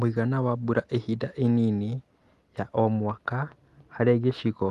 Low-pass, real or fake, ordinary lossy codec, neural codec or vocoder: 14.4 kHz; real; Opus, 24 kbps; none